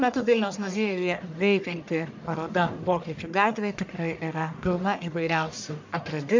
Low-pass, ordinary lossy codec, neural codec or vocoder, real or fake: 7.2 kHz; MP3, 64 kbps; codec, 44.1 kHz, 1.7 kbps, Pupu-Codec; fake